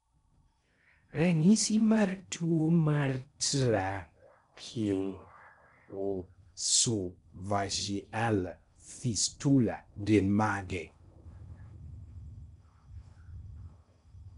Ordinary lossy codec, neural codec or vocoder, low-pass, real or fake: none; codec, 16 kHz in and 24 kHz out, 0.6 kbps, FocalCodec, streaming, 4096 codes; 10.8 kHz; fake